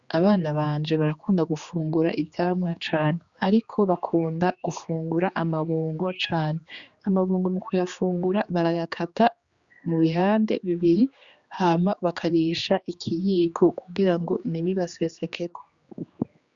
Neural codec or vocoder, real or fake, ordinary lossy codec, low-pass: codec, 16 kHz, 2 kbps, X-Codec, HuBERT features, trained on general audio; fake; Opus, 64 kbps; 7.2 kHz